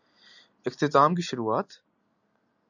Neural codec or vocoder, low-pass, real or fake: none; 7.2 kHz; real